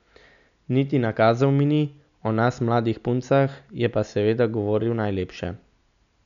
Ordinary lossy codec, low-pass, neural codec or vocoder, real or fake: none; 7.2 kHz; none; real